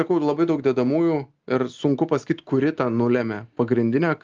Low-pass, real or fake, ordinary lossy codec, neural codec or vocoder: 7.2 kHz; real; Opus, 24 kbps; none